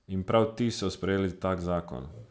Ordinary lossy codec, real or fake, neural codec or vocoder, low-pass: none; real; none; none